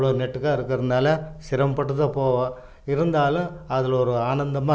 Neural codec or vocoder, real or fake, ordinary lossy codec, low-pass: none; real; none; none